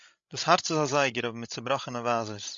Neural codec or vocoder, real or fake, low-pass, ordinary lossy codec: codec, 16 kHz, 16 kbps, FreqCodec, larger model; fake; 7.2 kHz; MP3, 64 kbps